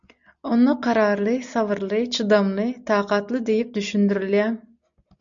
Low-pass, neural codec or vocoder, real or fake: 7.2 kHz; none; real